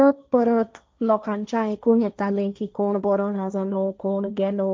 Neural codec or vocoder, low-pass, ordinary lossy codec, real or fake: codec, 16 kHz, 1.1 kbps, Voila-Tokenizer; none; none; fake